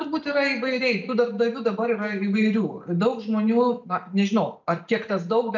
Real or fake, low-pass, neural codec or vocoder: real; 7.2 kHz; none